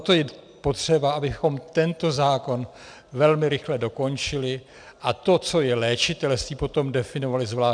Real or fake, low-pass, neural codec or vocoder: real; 9.9 kHz; none